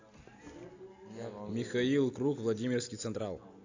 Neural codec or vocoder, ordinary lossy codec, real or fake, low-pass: none; AAC, 48 kbps; real; 7.2 kHz